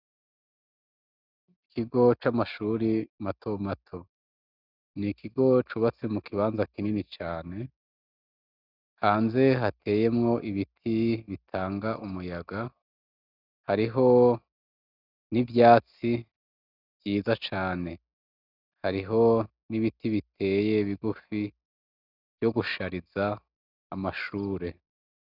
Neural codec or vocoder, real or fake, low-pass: none; real; 5.4 kHz